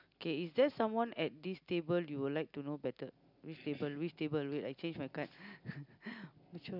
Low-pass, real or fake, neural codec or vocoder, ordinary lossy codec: 5.4 kHz; real; none; none